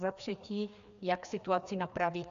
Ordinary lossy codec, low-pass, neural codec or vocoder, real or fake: AAC, 96 kbps; 7.2 kHz; codec, 16 kHz, 2 kbps, FreqCodec, larger model; fake